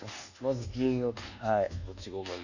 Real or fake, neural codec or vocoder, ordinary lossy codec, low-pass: fake; codec, 24 kHz, 1.2 kbps, DualCodec; none; 7.2 kHz